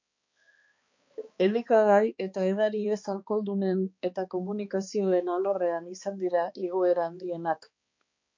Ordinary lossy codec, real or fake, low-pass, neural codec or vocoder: MP3, 48 kbps; fake; 7.2 kHz; codec, 16 kHz, 2 kbps, X-Codec, HuBERT features, trained on balanced general audio